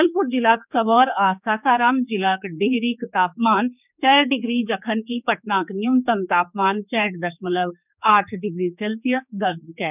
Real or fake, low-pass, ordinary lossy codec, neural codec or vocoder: fake; 3.6 kHz; none; codec, 16 kHz, 4 kbps, X-Codec, HuBERT features, trained on general audio